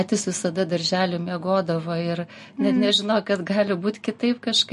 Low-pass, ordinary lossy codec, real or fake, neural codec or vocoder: 14.4 kHz; MP3, 48 kbps; real; none